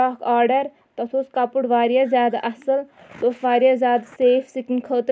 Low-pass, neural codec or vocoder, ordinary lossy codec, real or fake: none; none; none; real